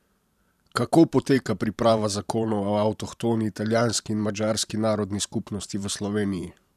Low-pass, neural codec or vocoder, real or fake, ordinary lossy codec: 14.4 kHz; vocoder, 44.1 kHz, 128 mel bands every 512 samples, BigVGAN v2; fake; none